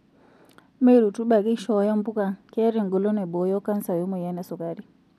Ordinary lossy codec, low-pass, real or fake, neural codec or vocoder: none; 14.4 kHz; real; none